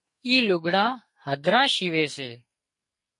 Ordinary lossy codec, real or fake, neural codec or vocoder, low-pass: MP3, 48 kbps; fake; codec, 44.1 kHz, 2.6 kbps, SNAC; 10.8 kHz